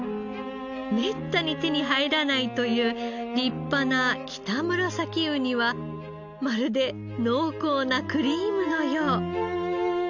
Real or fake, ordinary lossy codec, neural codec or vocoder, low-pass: real; none; none; 7.2 kHz